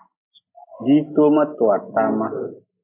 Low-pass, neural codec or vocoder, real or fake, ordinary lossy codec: 3.6 kHz; none; real; MP3, 24 kbps